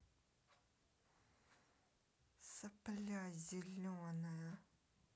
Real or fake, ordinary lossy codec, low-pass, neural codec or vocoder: real; none; none; none